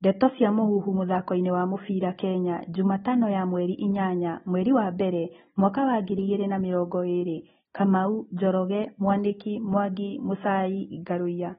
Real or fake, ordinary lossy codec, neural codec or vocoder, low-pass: real; AAC, 16 kbps; none; 19.8 kHz